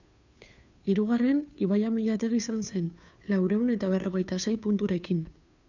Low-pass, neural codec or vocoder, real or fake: 7.2 kHz; codec, 16 kHz, 2 kbps, FunCodec, trained on Chinese and English, 25 frames a second; fake